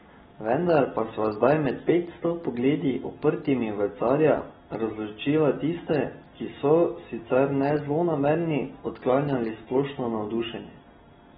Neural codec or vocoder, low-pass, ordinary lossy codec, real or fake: none; 19.8 kHz; AAC, 16 kbps; real